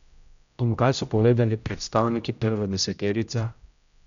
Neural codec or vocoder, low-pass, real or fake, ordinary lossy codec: codec, 16 kHz, 0.5 kbps, X-Codec, HuBERT features, trained on general audio; 7.2 kHz; fake; none